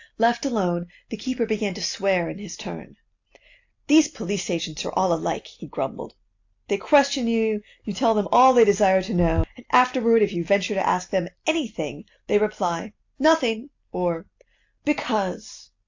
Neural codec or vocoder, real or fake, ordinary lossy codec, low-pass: none; real; AAC, 48 kbps; 7.2 kHz